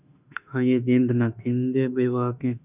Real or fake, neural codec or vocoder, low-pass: fake; autoencoder, 48 kHz, 32 numbers a frame, DAC-VAE, trained on Japanese speech; 3.6 kHz